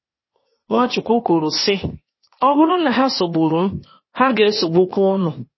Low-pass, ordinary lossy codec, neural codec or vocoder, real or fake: 7.2 kHz; MP3, 24 kbps; codec, 16 kHz, 0.8 kbps, ZipCodec; fake